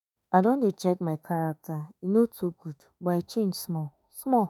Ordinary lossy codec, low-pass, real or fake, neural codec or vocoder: none; 19.8 kHz; fake; autoencoder, 48 kHz, 32 numbers a frame, DAC-VAE, trained on Japanese speech